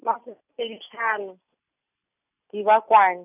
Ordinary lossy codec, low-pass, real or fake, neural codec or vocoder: none; 3.6 kHz; real; none